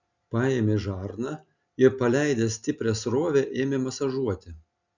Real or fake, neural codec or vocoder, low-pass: real; none; 7.2 kHz